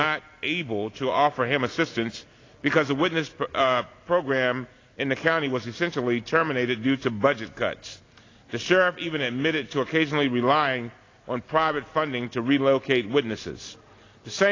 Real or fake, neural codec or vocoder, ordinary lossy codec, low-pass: real; none; AAC, 32 kbps; 7.2 kHz